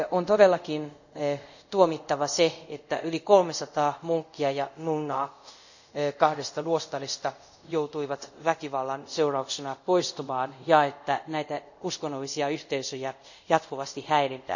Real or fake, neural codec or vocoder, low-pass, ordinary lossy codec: fake; codec, 24 kHz, 0.5 kbps, DualCodec; 7.2 kHz; none